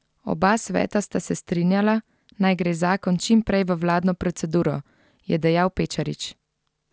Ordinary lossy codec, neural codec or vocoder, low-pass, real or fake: none; none; none; real